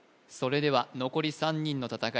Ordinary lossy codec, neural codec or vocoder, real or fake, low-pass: none; none; real; none